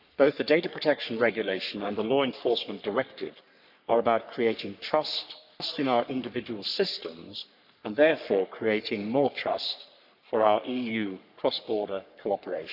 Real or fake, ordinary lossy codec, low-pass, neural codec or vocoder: fake; none; 5.4 kHz; codec, 44.1 kHz, 3.4 kbps, Pupu-Codec